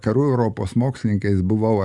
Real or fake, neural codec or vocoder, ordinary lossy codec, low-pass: real; none; Opus, 64 kbps; 10.8 kHz